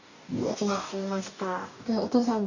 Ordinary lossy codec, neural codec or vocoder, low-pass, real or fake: none; codec, 44.1 kHz, 2.6 kbps, DAC; 7.2 kHz; fake